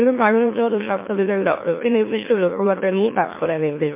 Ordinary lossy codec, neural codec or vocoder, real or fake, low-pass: MP3, 24 kbps; autoencoder, 44.1 kHz, a latent of 192 numbers a frame, MeloTTS; fake; 3.6 kHz